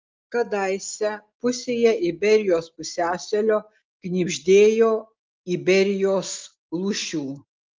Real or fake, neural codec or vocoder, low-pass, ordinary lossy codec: real; none; 7.2 kHz; Opus, 32 kbps